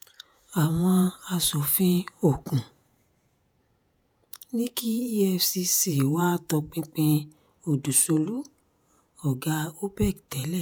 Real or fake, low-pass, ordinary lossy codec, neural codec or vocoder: fake; none; none; vocoder, 48 kHz, 128 mel bands, Vocos